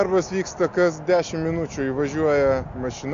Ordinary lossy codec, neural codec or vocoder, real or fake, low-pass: AAC, 64 kbps; none; real; 7.2 kHz